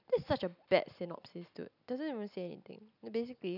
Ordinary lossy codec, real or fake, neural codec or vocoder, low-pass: none; real; none; 5.4 kHz